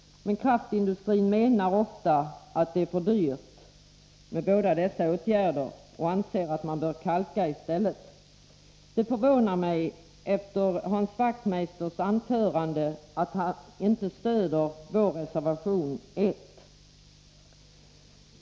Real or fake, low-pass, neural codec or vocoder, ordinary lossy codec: real; none; none; none